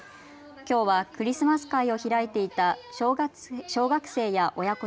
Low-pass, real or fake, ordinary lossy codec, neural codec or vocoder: none; real; none; none